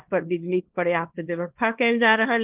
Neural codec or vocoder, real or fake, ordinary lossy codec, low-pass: codec, 24 kHz, 0.9 kbps, WavTokenizer, small release; fake; Opus, 16 kbps; 3.6 kHz